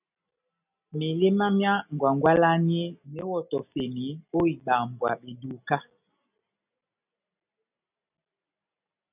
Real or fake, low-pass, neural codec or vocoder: real; 3.6 kHz; none